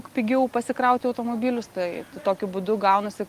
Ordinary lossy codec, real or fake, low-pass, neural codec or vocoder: Opus, 24 kbps; real; 14.4 kHz; none